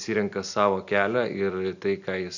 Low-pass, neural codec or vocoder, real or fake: 7.2 kHz; none; real